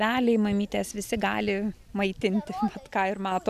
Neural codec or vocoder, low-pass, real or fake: none; 14.4 kHz; real